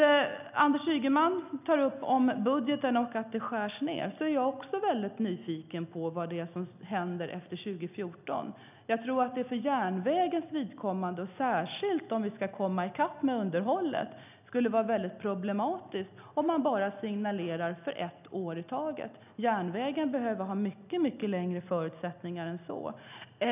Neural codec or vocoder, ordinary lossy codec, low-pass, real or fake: none; none; 3.6 kHz; real